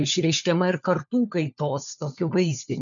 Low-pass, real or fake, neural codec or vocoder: 7.2 kHz; fake; codec, 16 kHz, 4 kbps, FunCodec, trained on LibriTTS, 50 frames a second